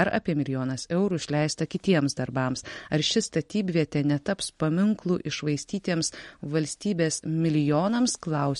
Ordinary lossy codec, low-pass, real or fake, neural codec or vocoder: MP3, 48 kbps; 19.8 kHz; real; none